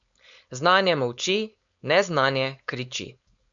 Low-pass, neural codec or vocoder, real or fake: 7.2 kHz; codec, 16 kHz, 4.8 kbps, FACodec; fake